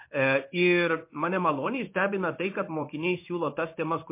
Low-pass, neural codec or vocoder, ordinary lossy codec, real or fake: 3.6 kHz; codec, 16 kHz in and 24 kHz out, 1 kbps, XY-Tokenizer; MP3, 24 kbps; fake